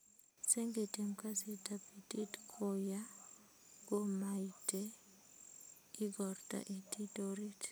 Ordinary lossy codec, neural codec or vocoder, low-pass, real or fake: none; none; none; real